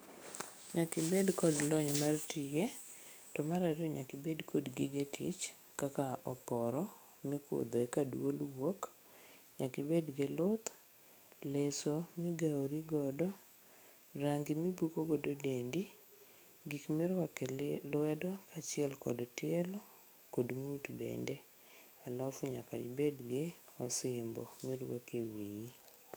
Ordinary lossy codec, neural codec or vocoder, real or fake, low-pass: none; codec, 44.1 kHz, 7.8 kbps, DAC; fake; none